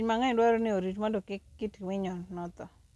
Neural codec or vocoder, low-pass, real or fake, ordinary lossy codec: none; none; real; none